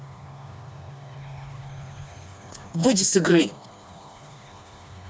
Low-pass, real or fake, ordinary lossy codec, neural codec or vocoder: none; fake; none; codec, 16 kHz, 2 kbps, FreqCodec, smaller model